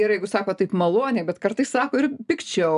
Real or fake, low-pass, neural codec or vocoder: real; 10.8 kHz; none